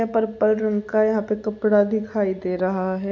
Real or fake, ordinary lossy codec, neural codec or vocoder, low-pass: real; none; none; none